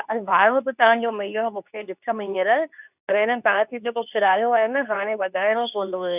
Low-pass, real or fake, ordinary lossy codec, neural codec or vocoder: 3.6 kHz; fake; none; codec, 24 kHz, 0.9 kbps, WavTokenizer, medium speech release version 2